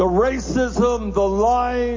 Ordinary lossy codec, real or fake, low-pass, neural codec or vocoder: MP3, 32 kbps; real; 7.2 kHz; none